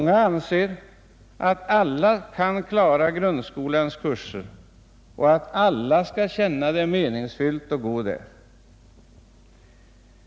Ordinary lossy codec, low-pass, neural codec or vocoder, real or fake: none; none; none; real